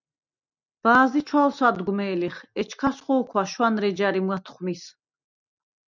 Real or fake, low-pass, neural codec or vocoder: real; 7.2 kHz; none